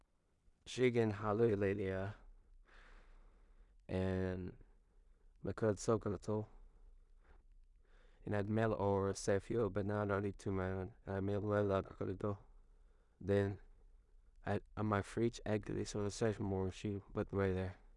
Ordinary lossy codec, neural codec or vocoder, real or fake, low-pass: none; codec, 16 kHz in and 24 kHz out, 0.4 kbps, LongCat-Audio-Codec, two codebook decoder; fake; 10.8 kHz